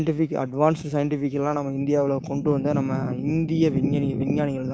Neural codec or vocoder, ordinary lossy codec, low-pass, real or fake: codec, 16 kHz, 6 kbps, DAC; none; none; fake